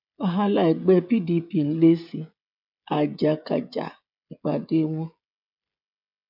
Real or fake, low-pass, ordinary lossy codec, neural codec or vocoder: fake; 5.4 kHz; none; codec, 16 kHz, 16 kbps, FreqCodec, smaller model